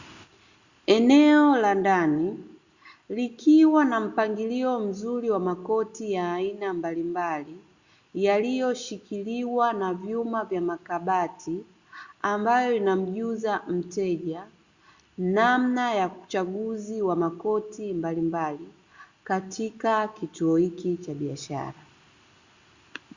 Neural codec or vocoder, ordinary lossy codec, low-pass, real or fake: none; Opus, 64 kbps; 7.2 kHz; real